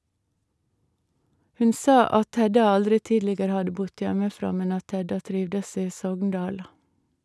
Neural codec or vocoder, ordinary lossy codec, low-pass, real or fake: none; none; none; real